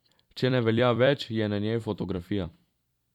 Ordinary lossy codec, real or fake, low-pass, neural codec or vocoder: none; fake; 19.8 kHz; vocoder, 44.1 kHz, 128 mel bands every 256 samples, BigVGAN v2